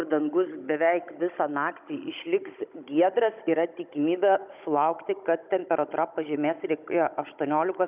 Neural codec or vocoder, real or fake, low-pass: codec, 16 kHz, 16 kbps, FunCodec, trained on Chinese and English, 50 frames a second; fake; 3.6 kHz